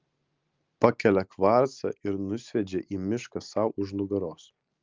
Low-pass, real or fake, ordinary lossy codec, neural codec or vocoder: 7.2 kHz; real; Opus, 32 kbps; none